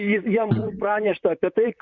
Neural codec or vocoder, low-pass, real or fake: vocoder, 44.1 kHz, 128 mel bands, Pupu-Vocoder; 7.2 kHz; fake